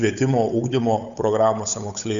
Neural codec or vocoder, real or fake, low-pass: codec, 16 kHz, 8 kbps, FunCodec, trained on Chinese and English, 25 frames a second; fake; 7.2 kHz